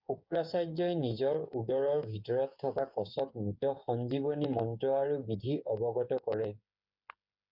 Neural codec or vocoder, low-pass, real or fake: codec, 16 kHz, 8 kbps, FreqCodec, smaller model; 5.4 kHz; fake